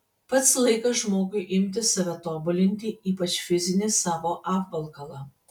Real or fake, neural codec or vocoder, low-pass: real; none; 19.8 kHz